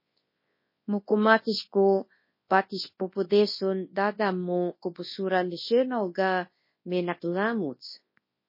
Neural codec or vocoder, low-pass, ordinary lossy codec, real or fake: codec, 24 kHz, 0.9 kbps, WavTokenizer, large speech release; 5.4 kHz; MP3, 24 kbps; fake